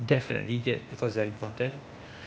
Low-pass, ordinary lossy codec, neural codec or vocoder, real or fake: none; none; codec, 16 kHz, 0.8 kbps, ZipCodec; fake